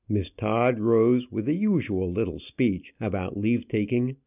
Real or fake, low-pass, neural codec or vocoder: real; 3.6 kHz; none